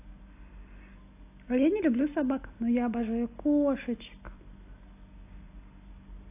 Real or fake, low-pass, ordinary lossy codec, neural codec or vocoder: real; 3.6 kHz; AAC, 24 kbps; none